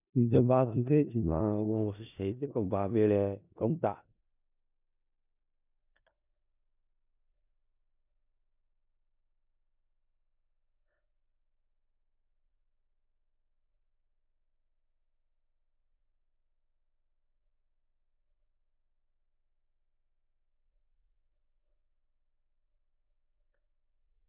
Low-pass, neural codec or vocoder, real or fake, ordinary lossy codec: 3.6 kHz; codec, 16 kHz in and 24 kHz out, 0.4 kbps, LongCat-Audio-Codec, four codebook decoder; fake; none